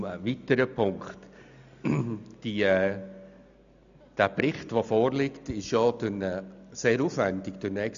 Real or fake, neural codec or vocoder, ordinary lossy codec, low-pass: real; none; AAC, 96 kbps; 7.2 kHz